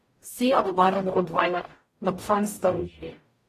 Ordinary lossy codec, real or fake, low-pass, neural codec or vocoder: AAC, 48 kbps; fake; 14.4 kHz; codec, 44.1 kHz, 0.9 kbps, DAC